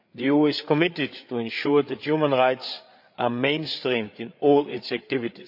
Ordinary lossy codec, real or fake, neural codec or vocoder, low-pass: MP3, 48 kbps; fake; codec, 16 kHz, 8 kbps, FreqCodec, larger model; 5.4 kHz